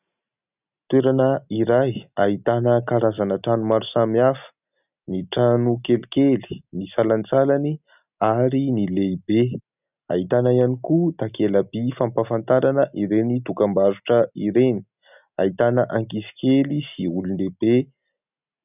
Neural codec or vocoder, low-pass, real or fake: none; 3.6 kHz; real